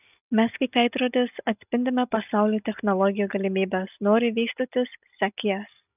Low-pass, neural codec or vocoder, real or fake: 3.6 kHz; none; real